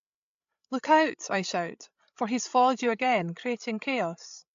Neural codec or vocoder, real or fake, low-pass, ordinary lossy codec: codec, 16 kHz, 16 kbps, FreqCodec, larger model; fake; 7.2 kHz; AAC, 64 kbps